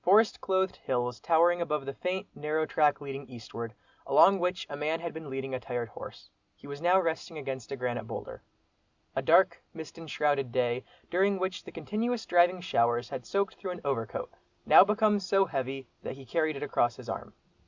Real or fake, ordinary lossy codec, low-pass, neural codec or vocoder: real; Opus, 64 kbps; 7.2 kHz; none